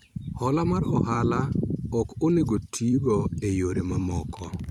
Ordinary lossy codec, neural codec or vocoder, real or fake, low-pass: none; vocoder, 44.1 kHz, 128 mel bands every 512 samples, BigVGAN v2; fake; 19.8 kHz